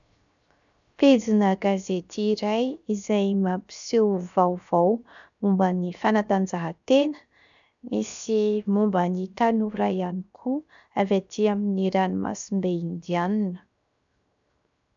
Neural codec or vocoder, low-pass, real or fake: codec, 16 kHz, 0.7 kbps, FocalCodec; 7.2 kHz; fake